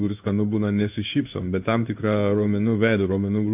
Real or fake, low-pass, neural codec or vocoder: fake; 3.6 kHz; codec, 16 kHz in and 24 kHz out, 1 kbps, XY-Tokenizer